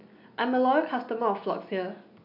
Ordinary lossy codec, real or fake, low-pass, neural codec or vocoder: none; real; 5.4 kHz; none